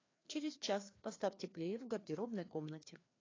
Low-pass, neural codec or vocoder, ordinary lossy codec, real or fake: 7.2 kHz; codec, 16 kHz, 2 kbps, FreqCodec, larger model; AAC, 32 kbps; fake